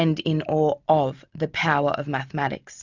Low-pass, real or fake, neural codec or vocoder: 7.2 kHz; real; none